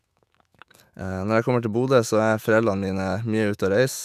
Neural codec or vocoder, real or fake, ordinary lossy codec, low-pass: autoencoder, 48 kHz, 128 numbers a frame, DAC-VAE, trained on Japanese speech; fake; none; 14.4 kHz